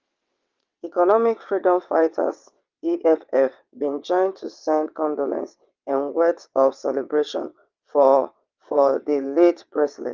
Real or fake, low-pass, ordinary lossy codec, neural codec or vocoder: fake; 7.2 kHz; Opus, 32 kbps; vocoder, 22.05 kHz, 80 mel bands, WaveNeXt